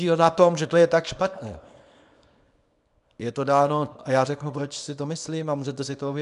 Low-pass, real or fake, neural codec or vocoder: 10.8 kHz; fake; codec, 24 kHz, 0.9 kbps, WavTokenizer, small release